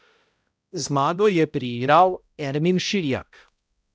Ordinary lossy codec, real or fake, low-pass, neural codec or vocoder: none; fake; none; codec, 16 kHz, 0.5 kbps, X-Codec, HuBERT features, trained on balanced general audio